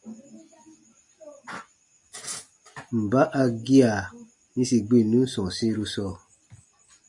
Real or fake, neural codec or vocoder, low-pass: real; none; 10.8 kHz